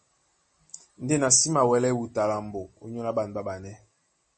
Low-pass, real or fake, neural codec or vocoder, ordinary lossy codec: 10.8 kHz; real; none; MP3, 32 kbps